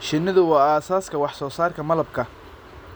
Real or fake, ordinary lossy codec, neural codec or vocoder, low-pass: real; none; none; none